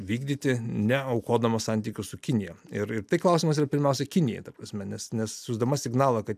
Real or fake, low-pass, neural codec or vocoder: real; 14.4 kHz; none